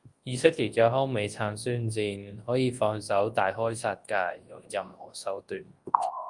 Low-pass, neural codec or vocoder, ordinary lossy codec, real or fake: 10.8 kHz; codec, 24 kHz, 0.9 kbps, WavTokenizer, large speech release; Opus, 32 kbps; fake